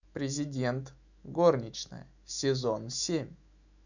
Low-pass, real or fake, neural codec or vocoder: 7.2 kHz; fake; autoencoder, 48 kHz, 128 numbers a frame, DAC-VAE, trained on Japanese speech